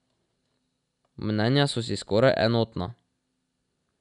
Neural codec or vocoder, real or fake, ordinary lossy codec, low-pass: none; real; none; 10.8 kHz